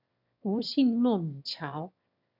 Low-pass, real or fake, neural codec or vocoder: 5.4 kHz; fake; autoencoder, 22.05 kHz, a latent of 192 numbers a frame, VITS, trained on one speaker